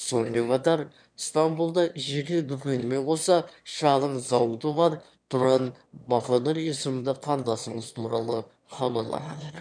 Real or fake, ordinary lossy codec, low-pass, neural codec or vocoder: fake; none; 9.9 kHz; autoencoder, 22.05 kHz, a latent of 192 numbers a frame, VITS, trained on one speaker